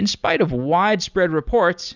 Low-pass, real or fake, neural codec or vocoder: 7.2 kHz; real; none